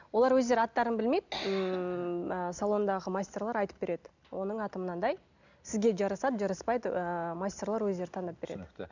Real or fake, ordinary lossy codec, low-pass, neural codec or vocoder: real; none; 7.2 kHz; none